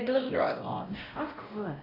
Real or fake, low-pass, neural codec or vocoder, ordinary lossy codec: fake; 5.4 kHz; codec, 16 kHz, 0.5 kbps, X-Codec, WavLM features, trained on Multilingual LibriSpeech; none